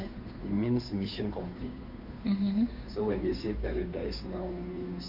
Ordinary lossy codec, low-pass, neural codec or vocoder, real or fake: none; 5.4 kHz; codec, 16 kHz, 2 kbps, FunCodec, trained on Chinese and English, 25 frames a second; fake